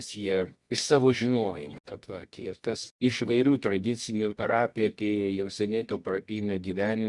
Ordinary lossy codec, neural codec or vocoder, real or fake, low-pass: Opus, 64 kbps; codec, 24 kHz, 0.9 kbps, WavTokenizer, medium music audio release; fake; 10.8 kHz